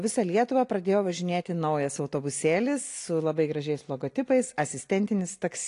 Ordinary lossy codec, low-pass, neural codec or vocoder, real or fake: AAC, 48 kbps; 10.8 kHz; none; real